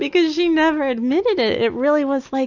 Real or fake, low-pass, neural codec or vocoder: real; 7.2 kHz; none